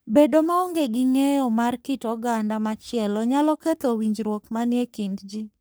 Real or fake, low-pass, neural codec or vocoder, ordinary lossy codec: fake; none; codec, 44.1 kHz, 3.4 kbps, Pupu-Codec; none